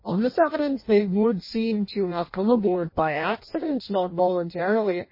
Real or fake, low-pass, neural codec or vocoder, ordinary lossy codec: fake; 5.4 kHz; codec, 16 kHz in and 24 kHz out, 0.6 kbps, FireRedTTS-2 codec; MP3, 24 kbps